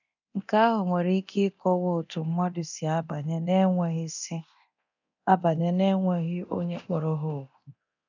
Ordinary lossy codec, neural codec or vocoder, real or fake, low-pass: none; codec, 24 kHz, 0.9 kbps, DualCodec; fake; 7.2 kHz